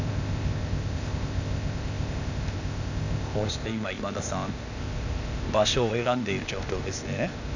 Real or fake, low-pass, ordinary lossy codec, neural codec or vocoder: fake; 7.2 kHz; none; codec, 16 kHz, 0.8 kbps, ZipCodec